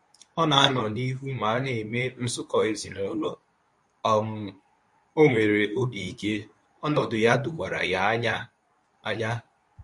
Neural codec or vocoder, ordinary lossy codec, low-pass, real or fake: codec, 24 kHz, 0.9 kbps, WavTokenizer, medium speech release version 2; MP3, 48 kbps; 10.8 kHz; fake